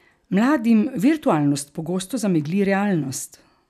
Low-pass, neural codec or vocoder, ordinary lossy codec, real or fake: 14.4 kHz; none; none; real